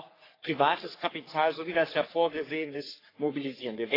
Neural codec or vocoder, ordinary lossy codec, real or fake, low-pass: codec, 44.1 kHz, 3.4 kbps, Pupu-Codec; AAC, 24 kbps; fake; 5.4 kHz